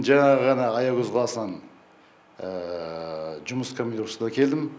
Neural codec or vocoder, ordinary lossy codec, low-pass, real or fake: none; none; none; real